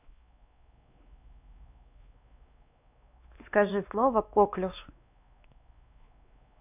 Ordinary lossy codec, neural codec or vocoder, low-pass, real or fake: none; codec, 16 kHz, 2 kbps, X-Codec, WavLM features, trained on Multilingual LibriSpeech; 3.6 kHz; fake